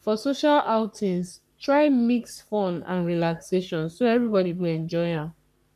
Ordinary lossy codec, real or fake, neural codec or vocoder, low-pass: none; fake; codec, 44.1 kHz, 3.4 kbps, Pupu-Codec; 14.4 kHz